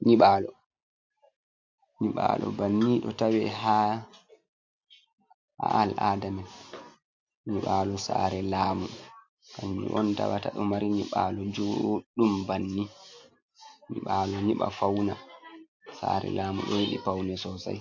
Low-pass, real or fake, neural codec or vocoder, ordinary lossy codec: 7.2 kHz; real; none; MP3, 48 kbps